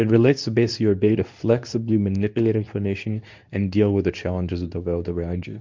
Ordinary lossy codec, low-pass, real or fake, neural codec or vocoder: MP3, 64 kbps; 7.2 kHz; fake; codec, 24 kHz, 0.9 kbps, WavTokenizer, medium speech release version 2